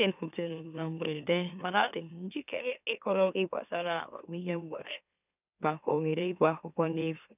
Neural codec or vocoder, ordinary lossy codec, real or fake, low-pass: autoencoder, 44.1 kHz, a latent of 192 numbers a frame, MeloTTS; none; fake; 3.6 kHz